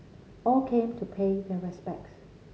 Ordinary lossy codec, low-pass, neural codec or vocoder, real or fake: none; none; none; real